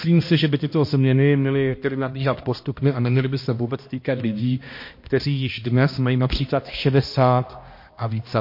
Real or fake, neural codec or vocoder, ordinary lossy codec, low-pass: fake; codec, 16 kHz, 1 kbps, X-Codec, HuBERT features, trained on balanced general audio; MP3, 32 kbps; 5.4 kHz